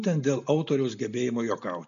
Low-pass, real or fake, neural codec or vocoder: 7.2 kHz; real; none